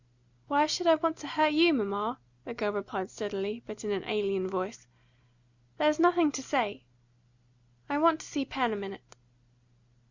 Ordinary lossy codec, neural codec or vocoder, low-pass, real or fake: Opus, 64 kbps; none; 7.2 kHz; real